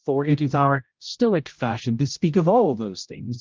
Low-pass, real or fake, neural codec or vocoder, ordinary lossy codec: 7.2 kHz; fake; codec, 16 kHz, 0.5 kbps, X-Codec, HuBERT features, trained on general audio; Opus, 32 kbps